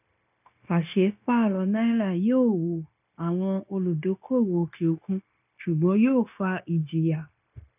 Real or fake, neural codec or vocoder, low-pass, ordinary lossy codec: fake; codec, 16 kHz, 0.9 kbps, LongCat-Audio-Codec; 3.6 kHz; none